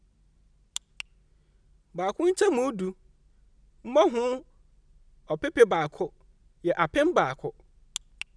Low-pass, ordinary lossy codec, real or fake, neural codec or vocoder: 9.9 kHz; none; real; none